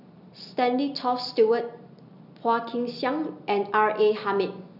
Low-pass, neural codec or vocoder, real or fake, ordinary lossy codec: 5.4 kHz; none; real; none